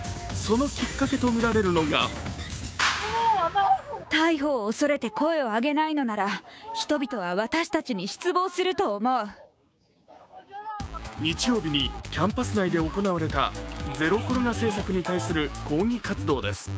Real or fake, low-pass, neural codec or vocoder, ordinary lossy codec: fake; none; codec, 16 kHz, 6 kbps, DAC; none